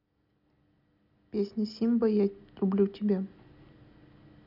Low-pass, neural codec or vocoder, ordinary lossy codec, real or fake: 5.4 kHz; none; none; real